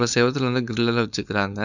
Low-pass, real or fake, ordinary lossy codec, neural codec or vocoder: 7.2 kHz; fake; none; codec, 16 kHz, 4.8 kbps, FACodec